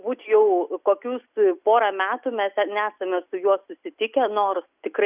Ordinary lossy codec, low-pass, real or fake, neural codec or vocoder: Opus, 64 kbps; 3.6 kHz; real; none